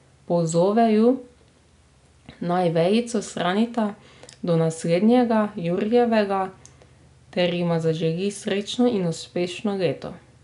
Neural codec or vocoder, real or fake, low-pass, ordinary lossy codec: none; real; 10.8 kHz; none